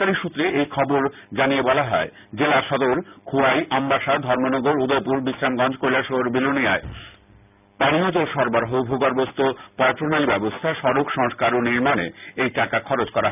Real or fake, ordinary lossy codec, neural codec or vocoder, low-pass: real; none; none; 3.6 kHz